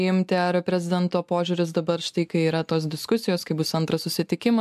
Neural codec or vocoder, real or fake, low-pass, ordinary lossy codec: none; real; 14.4 kHz; AAC, 96 kbps